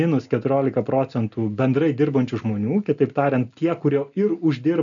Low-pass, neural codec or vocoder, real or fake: 7.2 kHz; none; real